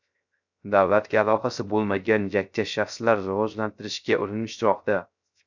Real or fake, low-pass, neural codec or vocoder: fake; 7.2 kHz; codec, 16 kHz, 0.3 kbps, FocalCodec